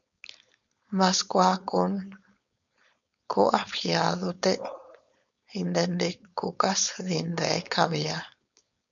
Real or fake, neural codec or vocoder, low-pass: fake; codec, 16 kHz, 4.8 kbps, FACodec; 7.2 kHz